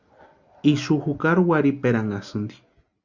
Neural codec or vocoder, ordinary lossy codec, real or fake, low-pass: none; Opus, 64 kbps; real; 7.2 kHz